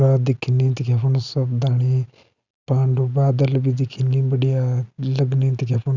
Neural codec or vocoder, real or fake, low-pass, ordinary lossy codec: none; real; 7.2 kHz; none